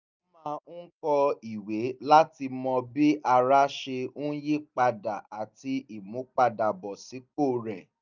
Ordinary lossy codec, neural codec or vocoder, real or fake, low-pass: none; none; real; 7.2 kHz